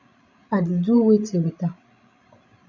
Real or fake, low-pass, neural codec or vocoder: fake; 7.2 kHz; codec, 16 kHz, 16 kbps, FreqCodec, larger model